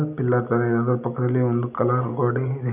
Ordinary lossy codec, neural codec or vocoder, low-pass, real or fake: none; none; 3.6 kHz; real